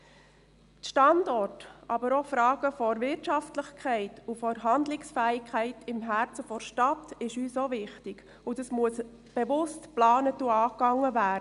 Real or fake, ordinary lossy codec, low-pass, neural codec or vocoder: real; none; 10.8 kHz; none